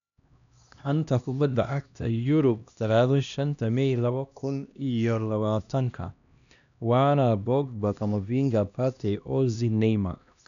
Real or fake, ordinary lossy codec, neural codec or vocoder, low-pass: fake; none; codec, 16 kHz, 1 kbps, X-Codec, HuBERT features, trained on LibriSpeech; 7.2 kHz